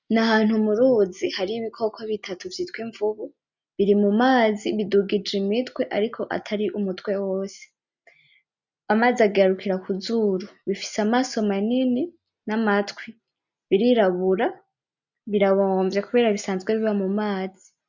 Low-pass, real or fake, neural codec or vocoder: 7.2 kHz; real; none